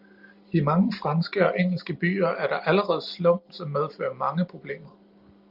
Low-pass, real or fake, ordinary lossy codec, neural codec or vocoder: 5.4 kHz; real; Opus, 32 kbps; none